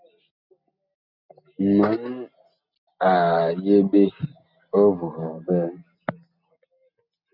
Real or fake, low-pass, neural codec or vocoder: real; 5.4 kHz; none